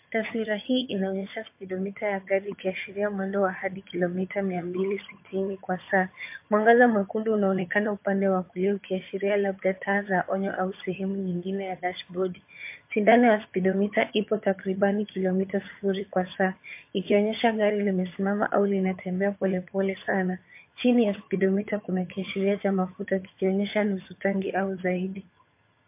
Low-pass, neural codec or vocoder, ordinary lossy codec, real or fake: 3.6 kHz; vocoder, 22.05 kHz, 80 mel bands, HiFi-GAN; MP3, 24 kbps; fake